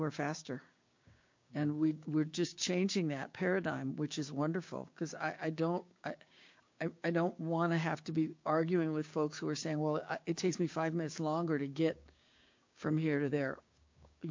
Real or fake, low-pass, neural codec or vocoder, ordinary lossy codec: fake; 7.2 kHz; codec, 16 kHz, 6 kbps, DAC; MP3, 48 kbps